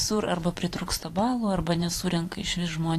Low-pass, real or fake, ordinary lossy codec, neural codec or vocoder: 14.4 kHz; fake; AAC, 48 kbps; vocoder, 44.1 kHz, 128 mel bands every 512 samples, BigVGAN v2